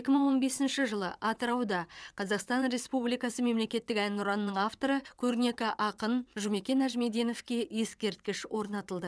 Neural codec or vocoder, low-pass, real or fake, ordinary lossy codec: vocoder, 22.05 kHz, 80 mel bands, WaveNeXt; none; fake; none